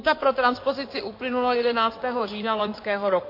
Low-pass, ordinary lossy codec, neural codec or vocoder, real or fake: 5.4 kHz; MP3, 32 kbps; codec, 16 kHz in and 24 kHz out, 2.2 kbps, FireRedTTS-2 codec; fake